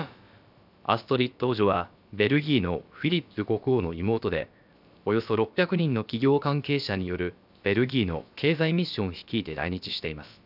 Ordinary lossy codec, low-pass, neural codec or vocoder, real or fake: none; 5.4 kHz; codec, 16 kHz, about 1 kbps, DyCAST, with the encoder's durations; fake